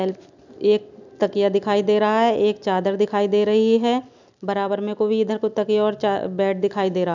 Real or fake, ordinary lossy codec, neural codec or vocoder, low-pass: real; none; none; 7.2 kHz